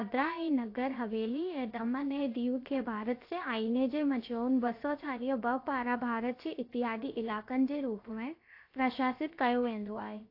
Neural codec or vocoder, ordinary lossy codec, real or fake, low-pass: codec, 16 kHz, about 1 kbps, DyCAST, with the encoder's durations; AAC, 32 kbps; fake; 5.4 kHz